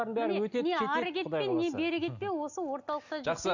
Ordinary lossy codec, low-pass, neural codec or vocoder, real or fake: none; 7.2 kHz; none; real